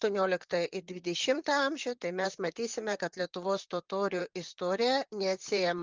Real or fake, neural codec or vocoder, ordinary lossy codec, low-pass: fake; vocoder, 44.1 kHz, 128 mel bands, Pupu-Vocoder; Opus, 24 kbps; 7.2 kHz